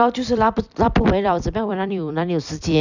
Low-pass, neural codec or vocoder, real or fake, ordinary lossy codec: 7.2 kHz; vocoder, 44.1 kHz, 128 mel bands every 256 samples, BigVGAN v2; fake; none